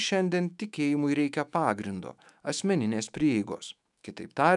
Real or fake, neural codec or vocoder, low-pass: fake; autoencoder, 48 kHz, 128 numbers a frame, DAC-VAE, trained on Japanese speech; 10.8 kHz